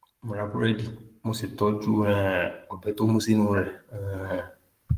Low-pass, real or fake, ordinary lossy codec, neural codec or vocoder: 14.4 kHz; fake; Opus, 32 kbps; codec, 44.1 kHz, 7.8 kbps, Pupu-Codec